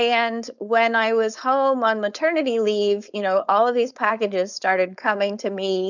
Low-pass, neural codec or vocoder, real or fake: 7.2 kHz; codec, 16 kHz, 4.8 kbps, FACodec; fake